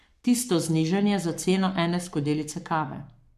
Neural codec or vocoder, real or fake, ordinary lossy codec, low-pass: codec, 44.1 kHz, 7.8 kbps, Pupu-Codec; fake; none; 14.4 kHz